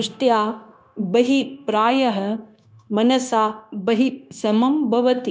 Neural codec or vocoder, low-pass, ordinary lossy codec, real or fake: codec, 16 kHz, 0.9 kbps, LongCat-Audio-Codec; none; none; fake